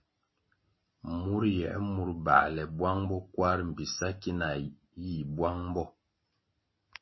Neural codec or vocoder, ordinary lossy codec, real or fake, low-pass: none; MP3, 24 kbps; real; 7.2 kHz